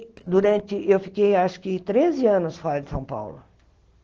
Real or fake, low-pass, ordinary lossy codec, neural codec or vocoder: real; 7.2 kHz; Opus, 16 kbps; none